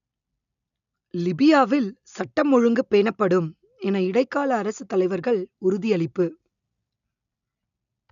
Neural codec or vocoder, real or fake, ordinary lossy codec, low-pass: none; real; none; 7.2 kHz